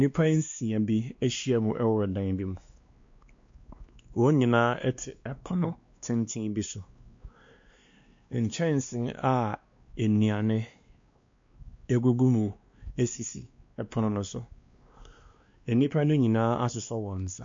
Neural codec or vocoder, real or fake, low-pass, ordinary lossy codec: codec, 16 kHz, 2 kbps, X-Codec, WavLM features, trained on Multilingual LibriSpeech; fake; 7.2 kHz; MP3, 48 kbps